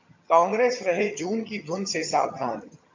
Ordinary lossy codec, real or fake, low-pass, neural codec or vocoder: MP3, 64 kbps; fake; 7.2 kHz; vocoder, 22.05 kHz, 80 mel bands, HiFi-GAN